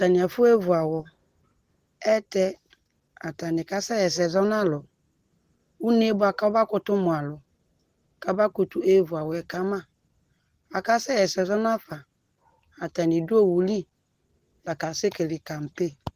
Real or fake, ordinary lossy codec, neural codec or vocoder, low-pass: real; Opus, 16 kbps; none; 14.4 kHz